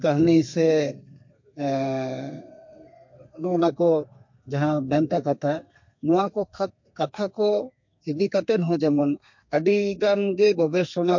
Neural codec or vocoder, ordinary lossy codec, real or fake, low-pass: codec, 32 kHz, 1.9 kbps, SNAC; MP3, 48 kbps; fake; 7.2 kHz